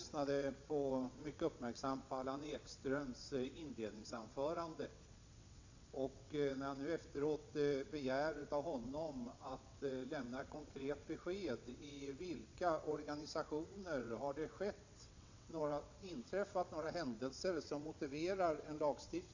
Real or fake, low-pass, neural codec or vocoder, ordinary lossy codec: fake; 7.2 kHz; vocoder, 22.05 kHz, 80 mel bands, WaveNeXt; AAC, 48 kbps